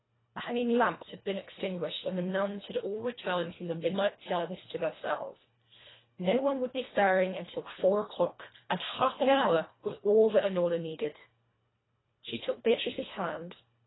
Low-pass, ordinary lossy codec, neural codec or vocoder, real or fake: 7.2 kHz; AAC, 16 kbps; codec, 24 kHz, 1.5 kbps, HILCodec; fake